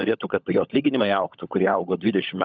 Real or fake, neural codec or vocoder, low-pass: fake; codec, 16 kHz, 16 kbps, FunCodec, trained on LibriTTS, 50 frames a second; 7.2 kHz